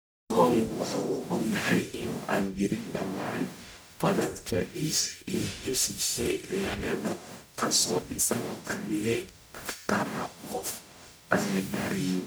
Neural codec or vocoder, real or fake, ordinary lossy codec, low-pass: codec, 44.1 kHz, 0.9 kbps, DAC; fake; none; none